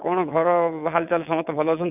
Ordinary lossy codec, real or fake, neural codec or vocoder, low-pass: none; fake; vocoder, 22.05 kHz, 80 mel bands, WaveNeXt; 3.6 kHz